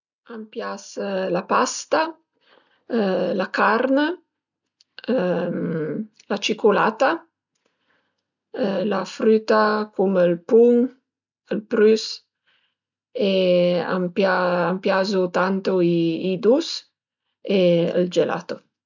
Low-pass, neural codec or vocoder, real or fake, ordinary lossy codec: 7.2 kHz; none; real; none